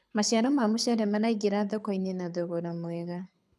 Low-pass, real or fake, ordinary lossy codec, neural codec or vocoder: none; fake; none; codec, 24 kHz, 6 kbps, HILCodec